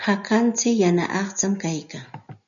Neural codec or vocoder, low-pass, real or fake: none; 7.2 kHz; real